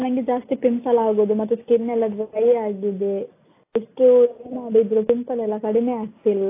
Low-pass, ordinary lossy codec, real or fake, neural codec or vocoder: 3.6 kHz; AAC, 24 kbps; real; none